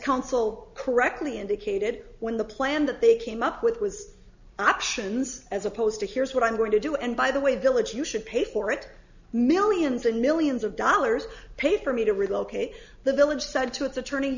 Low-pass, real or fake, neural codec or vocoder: 7.2 kHz; real; none